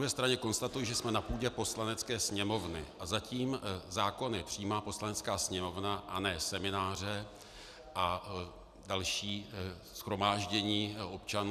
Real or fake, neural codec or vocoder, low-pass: real; none; 14.4 kHz